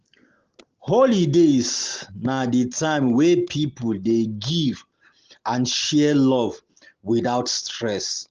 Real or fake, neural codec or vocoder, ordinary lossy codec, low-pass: real; none; Opus, 16 kbps; 7.2 kHz